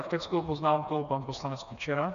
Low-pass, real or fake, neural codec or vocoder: 7.2 kHz; fake; codec, 16 kHz, 2 kbps, FreqCodec, smaller model